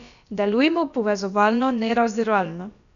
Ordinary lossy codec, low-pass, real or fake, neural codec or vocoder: none; 7.2 kHz; fake; codec, 16 kHz, about 1 kbps, DyCAST, with the encoder's durations